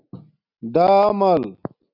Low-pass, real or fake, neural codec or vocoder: 5.4 kHz; real; none